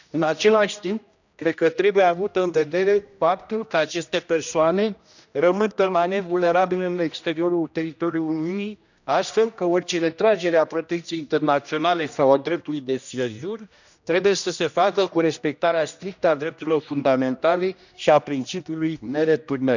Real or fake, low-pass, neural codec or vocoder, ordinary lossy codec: fake; 7.2 kHz; codec, 16 kHz, 1 kbps, X-Codec, HuBERT features, trained on general audio; none